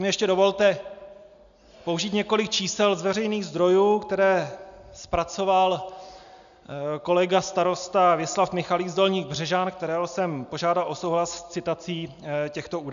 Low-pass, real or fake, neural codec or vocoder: 7.2 kHz; real; none